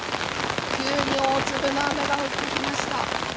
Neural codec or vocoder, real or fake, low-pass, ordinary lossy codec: none; real; none; none